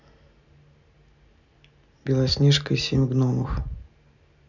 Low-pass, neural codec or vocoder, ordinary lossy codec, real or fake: 7.2 kHz; none; none; real